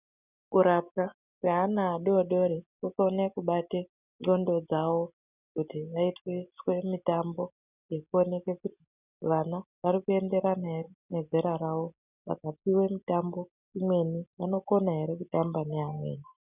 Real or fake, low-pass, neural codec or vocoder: real; 3.6 kHz; none